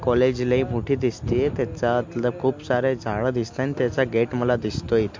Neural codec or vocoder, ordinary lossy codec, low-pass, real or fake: none; MP3, 48 kbps; 7.2 kHz; real